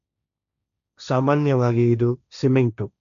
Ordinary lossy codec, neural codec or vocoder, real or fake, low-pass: none; codec, 16 kHz, 1.1 kbps, Voila-Tokenizer; fake; 7.2 kHz